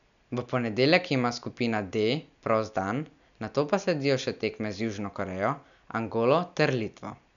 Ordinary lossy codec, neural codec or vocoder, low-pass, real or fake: none; none; 7.2 kHz; real